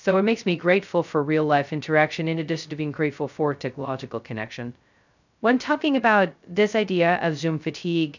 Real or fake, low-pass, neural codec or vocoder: fake; 7.2 kHz; codec, 16 kHz, 0.2 kbps, FocalCodec